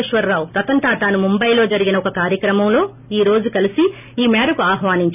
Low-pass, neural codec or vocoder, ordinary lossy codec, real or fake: 3.6 kHz; none; none; real